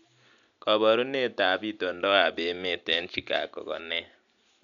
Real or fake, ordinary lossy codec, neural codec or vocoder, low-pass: real; none; none; 7.2 kHz